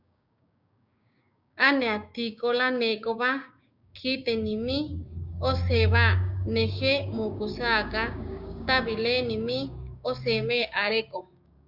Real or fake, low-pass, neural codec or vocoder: fake; 5.4 kHz; codec, 16 kHz, 6 kbps, DAC